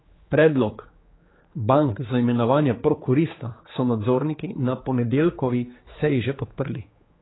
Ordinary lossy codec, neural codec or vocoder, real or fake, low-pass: AAC, 16 kbps; codec, 16 kHz, 4 kbps, X-Codec, HuBERT features, trained on general audio; fake; 7.2 kHz